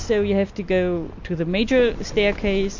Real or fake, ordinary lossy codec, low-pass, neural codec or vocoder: real; MP3, 48 kbps; 7.2 kHz; none